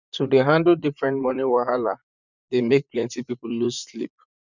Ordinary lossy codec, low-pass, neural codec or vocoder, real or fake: none; 7.2 kHz; vocoder, 44.1 kHz, 128 mel bands, Pupu-Vocoder; fake